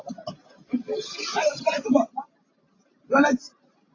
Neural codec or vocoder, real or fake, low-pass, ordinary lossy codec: none; real; 7.2 kHz; AAC, 32 kbps